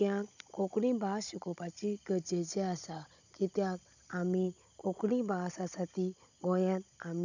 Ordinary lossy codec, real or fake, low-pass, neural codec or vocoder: none; fake; 7.2 kHz; codec, 16 kHz, 16 kbps, FunCodec, trained on Chinese and English, 50 frames a second